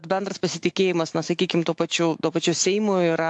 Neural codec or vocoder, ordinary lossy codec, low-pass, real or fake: autoencoder, 48 kHz, 128 numbers a frame, DAC-VAE, trained on Japanese speech; AAC, 64 kbps; 10.8 kHz; fake